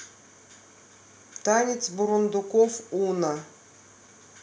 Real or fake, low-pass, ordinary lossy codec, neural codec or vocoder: real; none; none; none